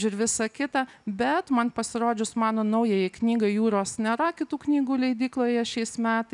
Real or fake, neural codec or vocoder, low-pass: real; none; 10.8 kHz